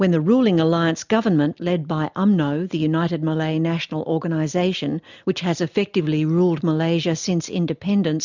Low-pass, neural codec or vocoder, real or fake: 7.2 kHz; none; real